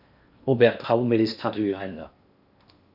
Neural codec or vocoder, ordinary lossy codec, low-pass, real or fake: codec, 16 kHz in and 24 kHz out, 0.8 kbps, FocalCodec, streaming, 65536 codes; Opus, 64 kbps; 5.4 kHz; fake